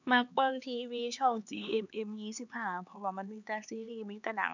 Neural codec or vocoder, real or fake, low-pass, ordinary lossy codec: codec, 16 kHz, 4 kbps, X-Codec, HuBERT features, trained on LibriSpeech; fake; 7.2 kHz; none